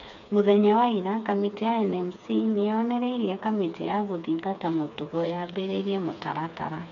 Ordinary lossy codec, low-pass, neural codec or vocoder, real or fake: none; 7.2 kHz; codec, 16 kHz, 4 kbps, FreqCodec, smaller model; fake